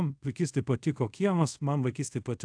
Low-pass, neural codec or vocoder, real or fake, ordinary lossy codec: 9.9 kHz; codec, 24 kHz, 0.5 kbps, DualCodec; fake; AAC, 64 kbps